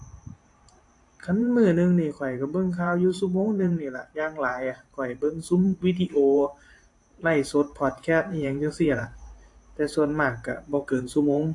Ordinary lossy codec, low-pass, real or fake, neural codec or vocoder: AAC, 48 kbps; 10.8 kHz; fake; vocoder, 44.1 kHz, 128 mel bands every 512 samples, BigVGAN v2